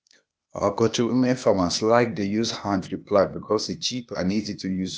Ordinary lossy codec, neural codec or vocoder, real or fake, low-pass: none; codec, 16 kHz, 0.8 kbps, ZipCodec; fake; none